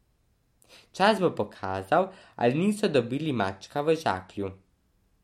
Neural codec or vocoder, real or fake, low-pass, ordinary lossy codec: none; real; 19.8 kHz; MP3, 64 kbps